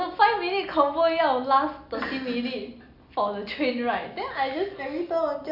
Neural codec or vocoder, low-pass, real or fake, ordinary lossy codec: none; 5.4 kHz; real; none